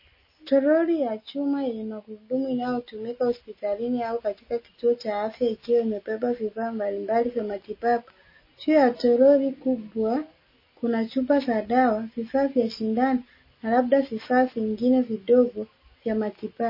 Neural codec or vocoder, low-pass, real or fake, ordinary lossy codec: none; 5.4 kHz; real; MP3, 24 kbps